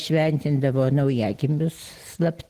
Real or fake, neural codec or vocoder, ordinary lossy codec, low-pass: real; none; Opus, 16 kbps; 14.4 kHz